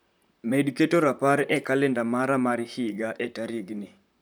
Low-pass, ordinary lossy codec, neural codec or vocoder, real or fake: none; none; vocoder, 44.1 kHz, 128 mel bands, Pupu-Vocoder; fake